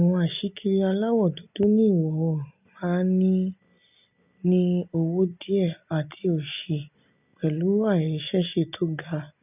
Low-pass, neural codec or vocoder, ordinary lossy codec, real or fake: 3.6 kHz; none; none; real